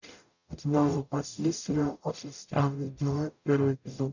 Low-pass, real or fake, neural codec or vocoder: 7.2 kHz; fake; codec, 44.1 kHz, 0.9 kbps, DAC